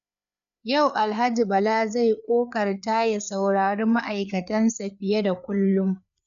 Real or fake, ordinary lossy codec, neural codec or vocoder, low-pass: fake; none; codec, 16 kHz, 4 kbps, FreqCodec, larger model; 7.2 kHz